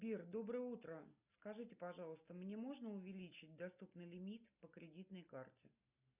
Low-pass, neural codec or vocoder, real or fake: 3.6 kHz; none; real